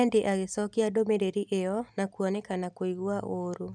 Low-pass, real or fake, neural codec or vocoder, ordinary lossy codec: 9.9 kHz; real; none; none